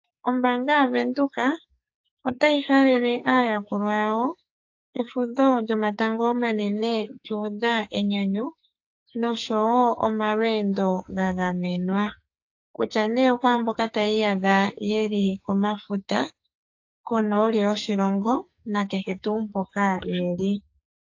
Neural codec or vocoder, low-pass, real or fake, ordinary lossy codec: codec, 44.1 kHz, 2.6 kbps, SNAC; 7.2 kHz; fake; AAC, 48 kbps